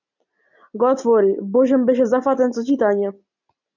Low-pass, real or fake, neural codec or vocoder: 7.2 kHz; real; none